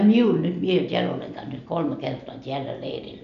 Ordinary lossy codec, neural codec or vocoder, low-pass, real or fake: none; none; 7.2 kHz; real